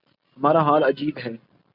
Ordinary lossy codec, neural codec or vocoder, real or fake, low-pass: AAC, 48 kbps; none; real; 5.4 kHz